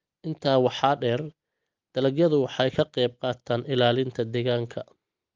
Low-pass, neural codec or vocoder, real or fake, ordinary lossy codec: 7.2 kHz; none; real; Opus, 32 kbps